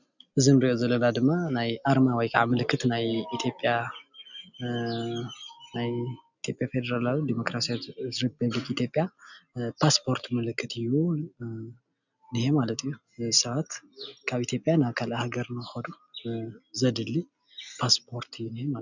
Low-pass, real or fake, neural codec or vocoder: 7.2 kHz; real; none